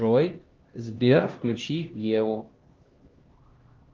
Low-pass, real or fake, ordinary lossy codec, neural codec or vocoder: 7.2 kHz; fake; Opus, 16 kbps; codec, 16 kHz, 1 kbps, X-Codec, HuBERT features, trained on LibriSpeech